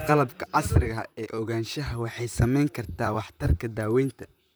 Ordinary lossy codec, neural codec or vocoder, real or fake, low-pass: none; vocoder, 44.1 kHz, 128 mel bands, Pupu-Vocoder; fake; none